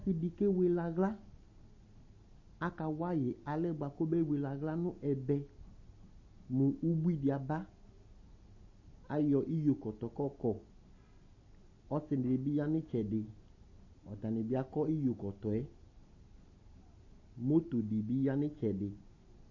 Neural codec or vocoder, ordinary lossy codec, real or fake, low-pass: none; MP3, 32 kbps; real; 7.2 kHz